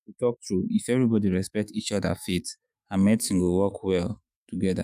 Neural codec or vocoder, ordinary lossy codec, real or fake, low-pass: autoencoder, 48 kHz, 128 numbers a frame, DAC-VAE, trained on Japanese speech; none; fake; 14.4 kHz